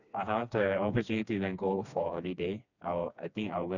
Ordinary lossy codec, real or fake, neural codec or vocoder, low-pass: none; fake; codec, 16 kHz, 2 kbps, FreqCodec, smaller model; 7.2 kHz